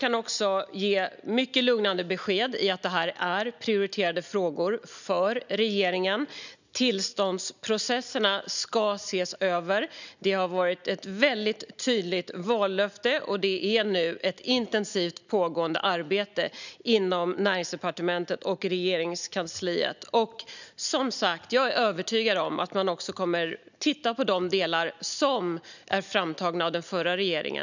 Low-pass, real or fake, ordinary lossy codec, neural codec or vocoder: 7.2 kHz; real; none; none